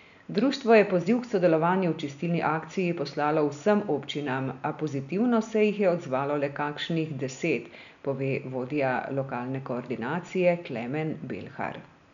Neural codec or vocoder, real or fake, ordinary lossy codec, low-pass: none; real; none; 7.2 kHz